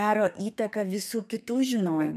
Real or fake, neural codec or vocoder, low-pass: fake; codec, 32 kHz, 1.9 kbps, SNAC; 14.4 kHz